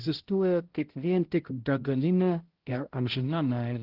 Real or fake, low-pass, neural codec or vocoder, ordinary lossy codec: fake; 5.4 kHz; codec, 16 kHz, 0.5 kbps, X-Codec, HuBERT features, trained on balanced general audio; Opus, 16 kbps